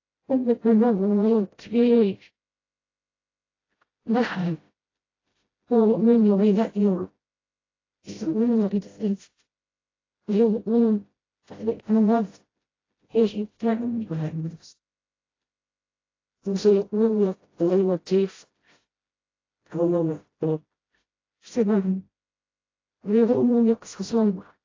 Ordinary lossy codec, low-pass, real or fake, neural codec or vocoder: AAC, 32 kbps; 7.2 kHz; fake; codec, 16 kHz, 0.5 kbps, FreqCodec, smaller model